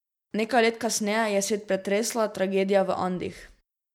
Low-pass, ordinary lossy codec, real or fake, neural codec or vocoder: 19.8 kHz; MP3, 96 kbps; real; none